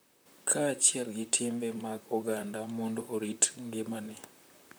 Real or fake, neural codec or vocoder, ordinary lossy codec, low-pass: fake; vocoder, 44.1 kHz, 128 mel bands, Pupu-Vocoder; none; none